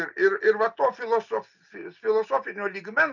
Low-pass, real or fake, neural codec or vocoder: 7.2 kHz; real; none